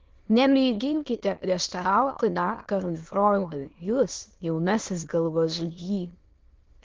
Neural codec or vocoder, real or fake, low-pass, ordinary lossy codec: autoencoder, 22.05 kHz, a latent of 192 numbers a frame, VITS, trained on many speakers; fake; 7.2 kHz; Opus, 16 kbps